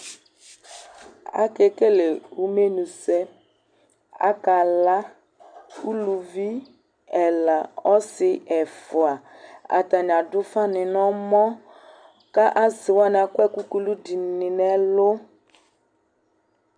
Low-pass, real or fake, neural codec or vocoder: 9.9 kHz; real; none